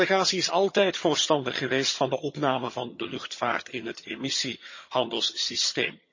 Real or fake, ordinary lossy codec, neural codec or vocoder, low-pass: fake; MP3, 32 kbps; vocoder, 22.05 kHz, 80 mel bands, HiFi-GAN; 7.2 kHz